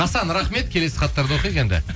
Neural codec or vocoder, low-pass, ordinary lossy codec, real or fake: none; none; none; real